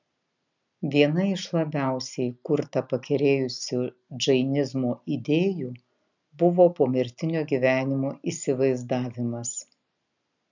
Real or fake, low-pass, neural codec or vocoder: real; 7.2 kHz; none